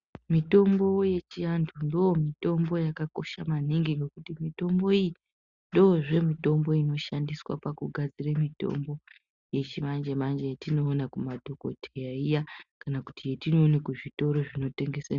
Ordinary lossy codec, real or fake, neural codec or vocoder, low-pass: Opus, 64 kbps; real; none; 7.2 kHz